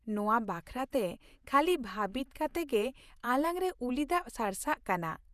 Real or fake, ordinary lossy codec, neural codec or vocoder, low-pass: fake; none; vocoder, 48 kHz, 128 mel bands, Vocos; 14.4 kHz